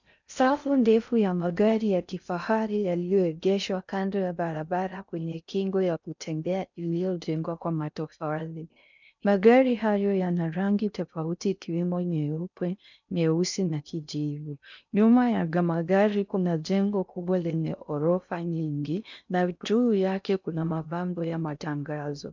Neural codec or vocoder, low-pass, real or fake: codec, 16 kHz in and 24 kHz out, 0.6 kbps, FocalCodec, streaming, 4096 codes; 7.2 kHz; fake